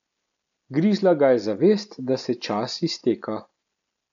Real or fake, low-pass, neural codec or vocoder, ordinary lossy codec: real; 7.2 kHz; none; none